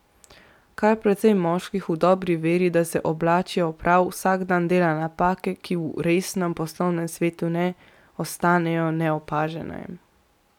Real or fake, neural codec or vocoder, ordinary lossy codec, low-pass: real; none; none; 19.8 kHz